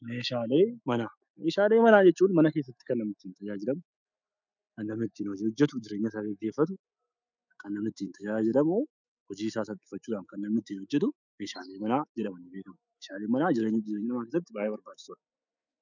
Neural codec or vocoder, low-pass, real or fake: autoencoder, 48 kHz, 128 numbers a frame, DAC-VAE, trained on Japanese speech; 7.2 kHz; fake